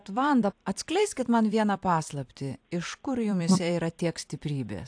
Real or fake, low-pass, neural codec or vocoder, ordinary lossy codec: fake; 9.9 kHz; vocoder, 44.1 kHz, 128 mel bands every 256 samples, BigVGAN v2; AAC, 64 kbps